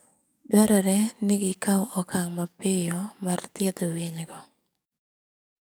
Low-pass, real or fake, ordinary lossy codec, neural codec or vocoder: none; fake; none; codec, 44.1 kHz, 7.8 kbps, DAC